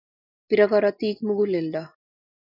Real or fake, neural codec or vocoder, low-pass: real; none; 5.4 kHz